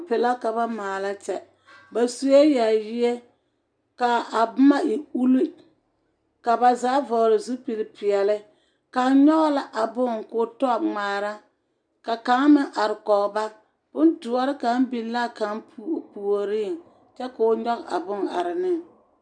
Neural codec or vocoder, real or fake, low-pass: none; real; 9.9 kHz